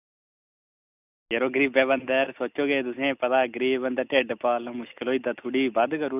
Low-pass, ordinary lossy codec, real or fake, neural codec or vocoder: 3.6 kHz; none; real; none